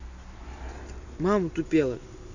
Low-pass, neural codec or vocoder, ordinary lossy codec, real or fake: 7.2 kHz; none; none; real